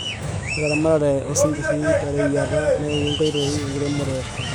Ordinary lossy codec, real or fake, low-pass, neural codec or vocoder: none; fake; 19.8 kHz; autoencoder, 48 kHz, 128 numbers a frame, DAC-VAE, trained on Japanese speech